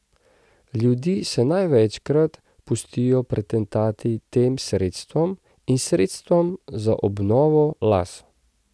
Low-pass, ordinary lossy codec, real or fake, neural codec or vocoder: none; none; real; none